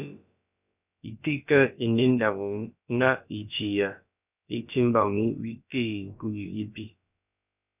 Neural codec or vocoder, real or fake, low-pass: codec, 16 kHz, about 1 kbps, DyCAST, with the encoder's durations; fake; 3.6 kHz